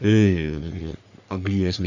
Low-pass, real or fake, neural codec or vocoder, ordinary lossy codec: 7.2 kHz; fake; codec, 44.1 kHz, 3.4 kbps, Pupu-Codec; none